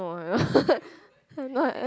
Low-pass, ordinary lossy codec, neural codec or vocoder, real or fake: none; none; none; real